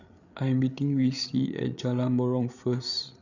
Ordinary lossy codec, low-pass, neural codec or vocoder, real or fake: none; 7.2 kHz; codec, 16 kHz, 8 kbps, FreqCodec, larger model; fake